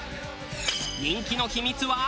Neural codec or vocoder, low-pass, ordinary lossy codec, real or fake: none; none; none; real